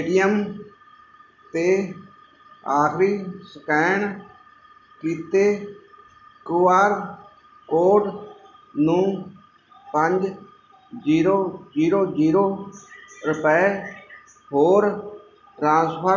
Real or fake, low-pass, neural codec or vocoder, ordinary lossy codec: real; 7.2 kHz; none; none